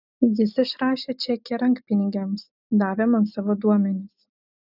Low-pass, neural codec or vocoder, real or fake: 5.4 kHz; none; real